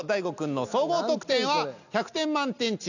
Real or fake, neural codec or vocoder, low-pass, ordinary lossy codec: real; none; 7.2 kHz; none